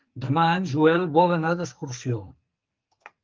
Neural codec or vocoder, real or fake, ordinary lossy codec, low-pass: codec, 44.1 kHz, 2.6 kbps, SNAC; fake; Opus, 24 kbps; 7.2 kHz